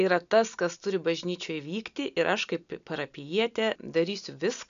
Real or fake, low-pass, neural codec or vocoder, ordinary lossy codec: real; 7.2 kHz; none; MP3, 96 kbps